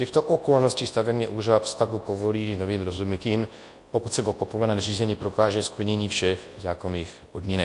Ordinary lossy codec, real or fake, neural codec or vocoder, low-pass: AAC, 48 kbps; fake; codec, 24 kHz, 0.9 kbps, WavTokenizer, large speech release; 10.8 kHz